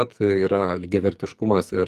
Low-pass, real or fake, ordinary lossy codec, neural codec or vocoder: 14.4 kHz; fake; Opus, 32 kbps; codec, 44.1 kHz, 2.6 kbps, SNAC